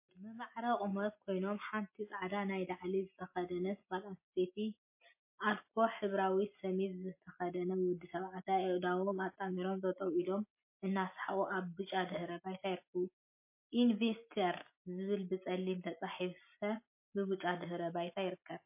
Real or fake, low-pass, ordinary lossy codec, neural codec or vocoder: real; 3.6 kHz; MP3, 24 kbps; none